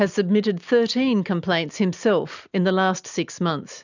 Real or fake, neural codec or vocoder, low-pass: real; none; 7.2 kHz